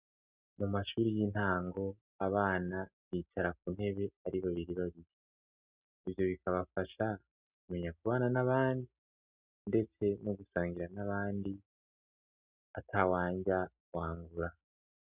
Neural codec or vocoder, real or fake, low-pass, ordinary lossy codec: none; real; 3.6 kHz; Opus, 64 kbps